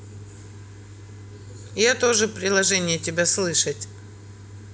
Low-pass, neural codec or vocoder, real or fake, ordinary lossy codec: none; none; real; none